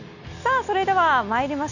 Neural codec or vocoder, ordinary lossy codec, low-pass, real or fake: none; none; 7.2 kHz; real